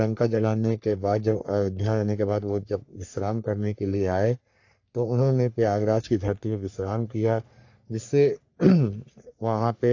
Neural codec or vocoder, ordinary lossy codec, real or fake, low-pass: codec, 44.1 kHz, 3.4 kbps, Pupu-Codec; AAC, 48 kbps; fake; 7.2 kHz